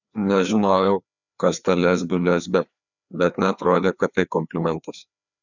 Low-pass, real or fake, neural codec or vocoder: 7.2 kHz; fake; codec, 16 kHz, 2 kbps, FreqCodec, larger model